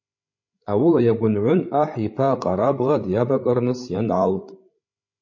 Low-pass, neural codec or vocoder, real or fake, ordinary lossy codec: 7.2 kHz; codec, 16 kHz, 16 kbps, FreqCodec, larger model; fake; MP3, 32 kbps